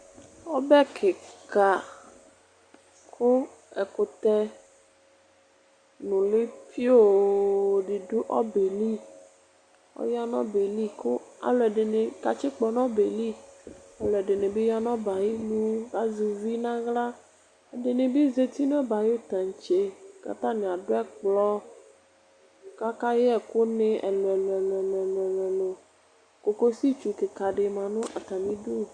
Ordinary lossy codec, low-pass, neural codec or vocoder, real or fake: Opus, 64 kbps; 9.9 kHz; none; real